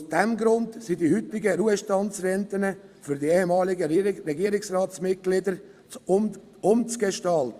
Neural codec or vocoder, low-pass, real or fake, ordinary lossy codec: vocoder, 44.1 kHz, 128 mel bands every 256 samples, BigVGAN v2; 14.4 kHz; fake; Opus, 64 kbps